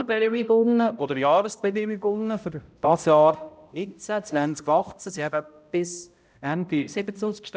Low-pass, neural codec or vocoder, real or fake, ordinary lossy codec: none; codec, 16 kHz, 0.5 kbps, X-Codec, HuBERT features, trained on balanced general audio; fake; none